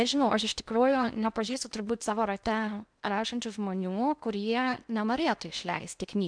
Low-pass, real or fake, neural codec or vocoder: 9.9 kHz; fake; codec, 16 kHz in and 24 kHz out, 0.6 kbps, FocalCodec, streaming, 2048 codes